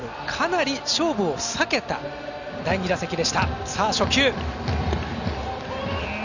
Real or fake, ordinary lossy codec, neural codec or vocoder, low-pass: real; none; none; 7.2 kHz